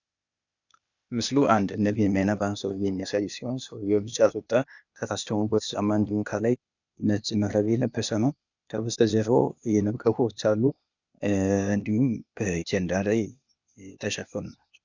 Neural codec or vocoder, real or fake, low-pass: codec, 16 kHz, 0.8 kbps, ZipCodec; fake; 7.2 kHz